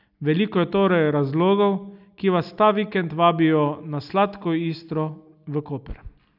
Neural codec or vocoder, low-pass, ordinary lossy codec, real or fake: none; 5.4 kHz; none; real